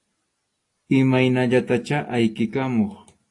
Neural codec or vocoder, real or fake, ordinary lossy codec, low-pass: none; real; AAC, 48 kbps; 10.8 kHz